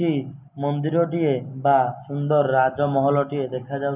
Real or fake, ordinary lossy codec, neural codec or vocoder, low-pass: real; none; none; 3.6 kHz